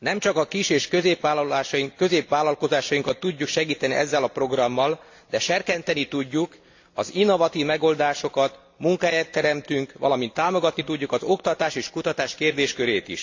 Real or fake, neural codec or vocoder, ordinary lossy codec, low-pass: real; none; AAC, 48 kbps; 7.2 kHz